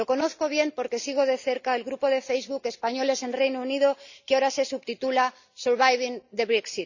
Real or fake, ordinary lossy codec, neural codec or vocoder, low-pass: real; none; none; 7.2 kHz